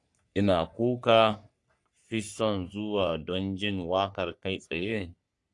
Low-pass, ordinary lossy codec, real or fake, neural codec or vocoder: 10.8 kHz; AAC, 64 kbps; fake; codec, 44.1 kHz, 3.4 kbps, Pupu-Codec